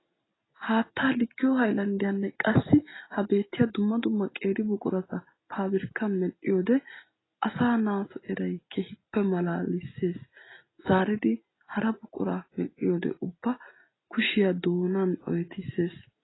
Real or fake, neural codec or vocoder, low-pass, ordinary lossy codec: real; none; 7.2 kHz; AAC, 16 kbps